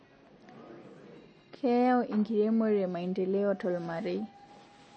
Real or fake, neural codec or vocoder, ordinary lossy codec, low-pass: real; none; MP3, 32 kbps; 9.9 kHz